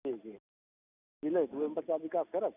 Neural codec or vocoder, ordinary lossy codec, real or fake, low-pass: none; none; real; 3.6 kHz